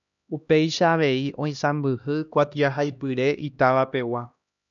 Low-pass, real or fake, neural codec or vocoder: 7.2 kHz; fake; codec, 16 kHz, 1 kbps, X-Codec, HuBERT features, trained on LibriSpeech